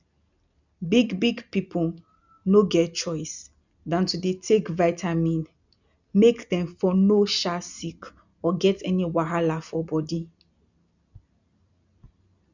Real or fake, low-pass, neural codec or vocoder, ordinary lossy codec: real; 7.2 kHz; none; none